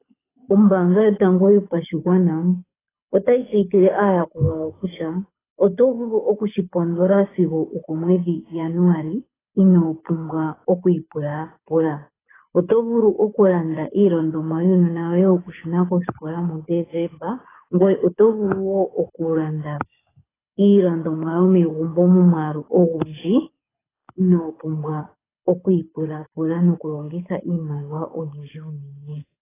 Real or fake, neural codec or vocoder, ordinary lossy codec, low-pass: fake; codec, 24 kHz, 6 kbps, HILCodec; AAC, 16 kbps; 3.6 kHz